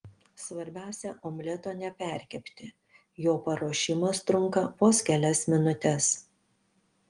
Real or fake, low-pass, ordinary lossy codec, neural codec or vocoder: real; 9.9 kHz; Opus, 24 kbps; none